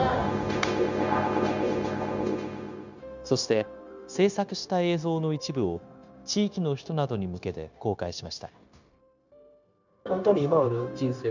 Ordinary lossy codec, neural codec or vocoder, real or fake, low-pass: none; codec, 16 kHz, 0.9 kbps, LongCat-Audio-Codec; fake; 7.2 kHz